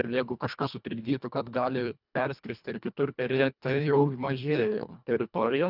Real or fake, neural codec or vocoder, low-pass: fake; codec, 24 kHz, 1.5 kbps, HILCodec; 5.4 kHz